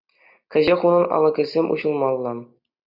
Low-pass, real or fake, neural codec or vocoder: 5.4 kHz; real; none